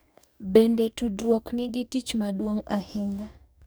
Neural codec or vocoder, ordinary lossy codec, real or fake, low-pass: codec, 44.1 kHz, 2.6 kbps, DAC; none; fake; none